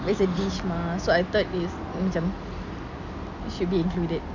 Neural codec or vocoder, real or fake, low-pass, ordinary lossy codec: none; real; 7.2 kHz; none